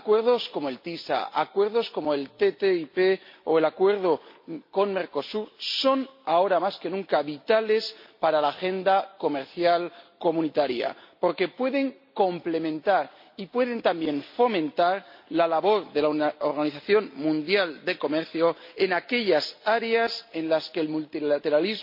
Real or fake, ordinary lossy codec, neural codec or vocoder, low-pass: real; MP3, 32 kbps; none; 5.4 kHz